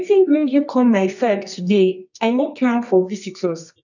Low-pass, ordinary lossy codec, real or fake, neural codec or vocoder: 7.2 kHz; none; fake; codec, 24 kHz, 0.9 kbps, WavTokenizer, medium music audio release